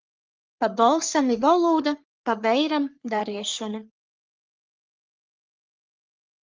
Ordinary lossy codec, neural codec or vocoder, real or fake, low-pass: Opus, 24 kbps; codec, 44.1 kHz, 3.4 kbps, Pupu-Codec; fake; 7.2 kHz